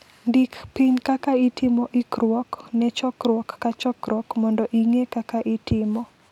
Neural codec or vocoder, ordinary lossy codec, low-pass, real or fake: none; none; 19.8 kHz; real